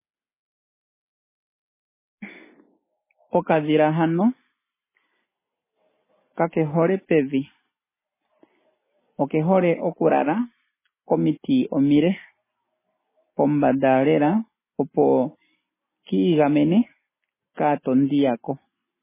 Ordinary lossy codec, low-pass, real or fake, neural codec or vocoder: MP3, 16 kbps; 3.6 kHz; real; none